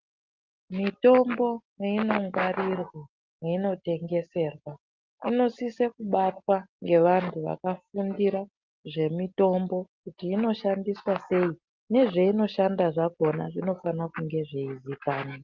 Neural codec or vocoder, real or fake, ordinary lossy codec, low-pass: none; real; Opus, 32 kbps; 7.2 kHz